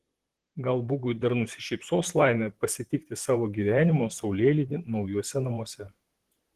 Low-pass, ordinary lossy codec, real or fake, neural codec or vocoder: 14.4 kHz; Opus, 16 kbps; fake; vocoder, 44.1 kHz, 128 mel bands, Pupu-Vocoder